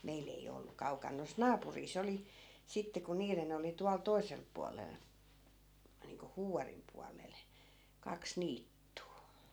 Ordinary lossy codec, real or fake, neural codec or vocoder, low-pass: none; real; none; none